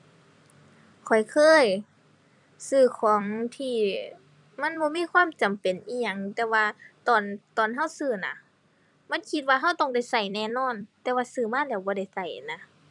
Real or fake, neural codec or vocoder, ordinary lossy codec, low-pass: fake; vocoder, 44.1 kHz, 128 mel bands every 256 samples, BigVGAN v2; none; 10.8 kHz